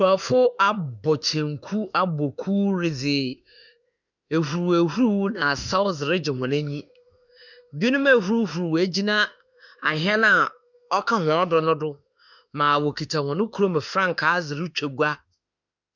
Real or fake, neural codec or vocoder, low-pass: fake; autoencoder, 48 kHz, 32 numbers a frame, DAC-VAE, trained on Japanese speech; 7.2 kHz